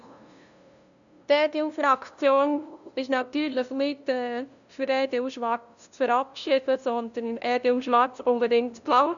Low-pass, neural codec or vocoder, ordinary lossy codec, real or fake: 7.2 kHz; codec, 16 kHz, 0.5 kbps, FunCodec, trained on LibriTTS, 25 frames a second; none; fake